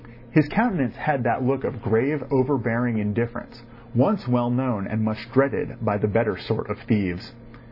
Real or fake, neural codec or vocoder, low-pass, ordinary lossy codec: real; none; 5.4 kHz; AAC, 32 kbps